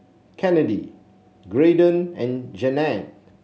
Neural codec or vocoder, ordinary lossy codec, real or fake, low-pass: none; none; real; none